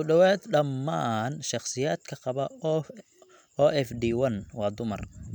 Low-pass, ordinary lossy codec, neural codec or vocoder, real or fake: 19.8 kHz; none; none; real